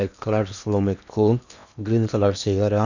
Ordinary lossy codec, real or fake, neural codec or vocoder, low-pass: none; fake; codec, 16 kHz in and 24 kHz out, 0.8 kbps, FocalCodec, streaming, 65536 codes; 7.2 kHz